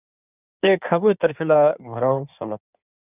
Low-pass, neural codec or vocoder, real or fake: 3.6 kHz; codec, 16 kHz in and 24 kHz out, 1.1 kbps, FireRedTTS-2 codec; fake